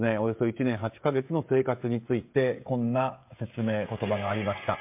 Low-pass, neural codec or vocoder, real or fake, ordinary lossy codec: 3.6 kHz; codec, 16 kHz, 16 kbps, FreqCodec, smaller model; fake; MP3, 32 kbps